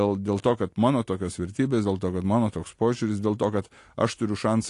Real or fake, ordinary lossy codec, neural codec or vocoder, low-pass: real; AAC, 48 kbps; none; 10.8 kHz